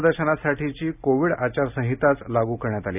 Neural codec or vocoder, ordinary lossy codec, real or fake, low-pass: none; none; real; 3.6 kHz